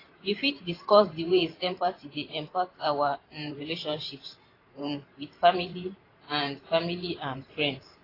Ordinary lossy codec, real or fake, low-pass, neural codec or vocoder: AAC, 24 kbps; fake; 5.4 kHz; vocoder, 44.1 kHz, 128 mel bands every 512 samples, BigVGAN v2